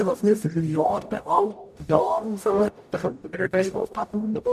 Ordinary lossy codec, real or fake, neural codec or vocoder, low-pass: none; fake; codec, 44.1 kHz, 0.9 kbps, DAC; 14.4 kHz